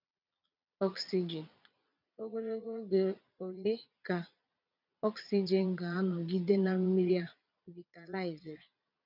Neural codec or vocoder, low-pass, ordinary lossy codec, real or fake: vocoder, 22.05 kHz, 80 mel bands, Vocos; 5.4 kHz; none; fake